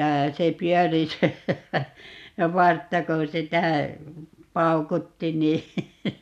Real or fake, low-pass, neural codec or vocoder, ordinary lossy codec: real; 14.4 kHz; none; none